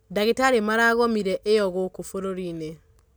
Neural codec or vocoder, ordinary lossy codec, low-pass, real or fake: none; none; none; real